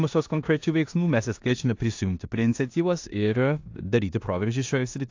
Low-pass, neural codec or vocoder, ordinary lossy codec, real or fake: 7.2 kHz; codec, 16 kHz in and 24 kHz out, 0.9 kbps, LongCat-Audio-Codec, four codebook decoder; AAC, 48 kbps; fake